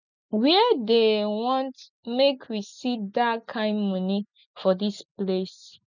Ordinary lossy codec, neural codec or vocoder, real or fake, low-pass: none; none; real; 7.2 kHz